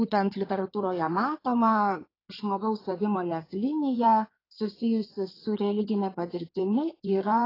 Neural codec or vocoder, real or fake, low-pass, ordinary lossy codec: codec, 16 kHz in and 24 kHz out, 2.2 kbps, FireRedTTS-2 codec; fake; 5.4 kHz; AAC, 24 kbps